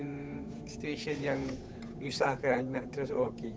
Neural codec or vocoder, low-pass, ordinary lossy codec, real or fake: codec, 16 kHz, 8 kbps, FunCodec, trained on Chinese and English, 25 frames a second; none; none; fake